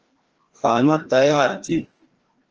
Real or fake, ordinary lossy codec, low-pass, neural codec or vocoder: fake; Opus, 16 kbps; 7.2 kHz; codec, 16 kHz, 1 kbps, FreqCodec, larger model